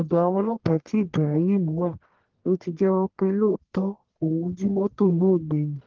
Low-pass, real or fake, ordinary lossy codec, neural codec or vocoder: 7.2 kHz; fake; Opus, 16 kbps; codec, 44.1 kHz, 1.7 kbps, Pupu-Codec